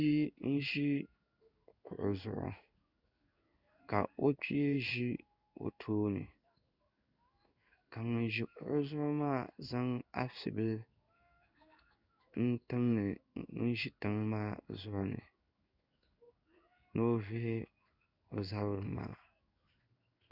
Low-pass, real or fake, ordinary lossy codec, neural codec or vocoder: 5.4 kHz; fake; Opus, 64 kbps; codec, 16 kHz in and 24 kHz out, 1 kbps, XY-Tokenizer